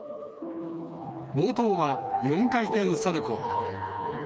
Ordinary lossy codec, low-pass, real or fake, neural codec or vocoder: none; none; fake; codec, 16 kHz, 2 kbps, FreqCodec, smaller model